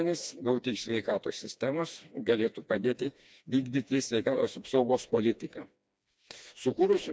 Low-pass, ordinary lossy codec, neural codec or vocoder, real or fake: none; none; codec, 16 kHz, 2 kbps, FreqCodec, smaller model; fake